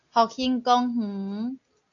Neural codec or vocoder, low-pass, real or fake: none; 7.2 kHz; real